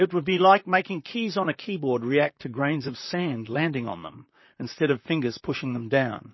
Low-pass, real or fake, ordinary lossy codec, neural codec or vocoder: 7.2 kHz; fake; MP3, 24 kbps; vocoder, 44.1 kHz, 128 mel bands, Pupu-Vocoder